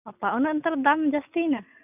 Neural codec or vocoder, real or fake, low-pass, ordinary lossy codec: none; real; 3.6 kHz; none